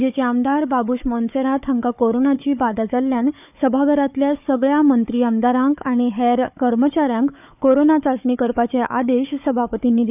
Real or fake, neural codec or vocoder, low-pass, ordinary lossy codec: fake; codec, 16 kHz, 4 kbps, FunCodec, trained on Chinese and English, 50 frames a second; 3.6 kHz; none